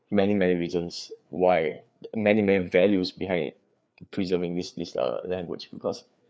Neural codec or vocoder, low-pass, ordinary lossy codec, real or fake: codec, 16 kHz, 4 kbps, FreqCodec, larger model; none; none; fake